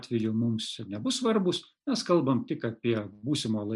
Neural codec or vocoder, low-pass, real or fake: none; 10.8 kHz; real